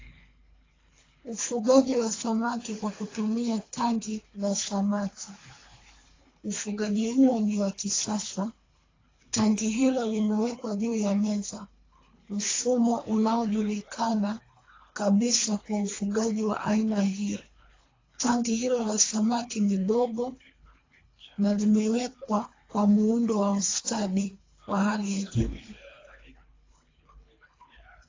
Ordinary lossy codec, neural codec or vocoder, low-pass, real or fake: AAC, 32 kbps; codec, 24 kHz, 3 kbps, HILCodec; 7.2 kHz; fake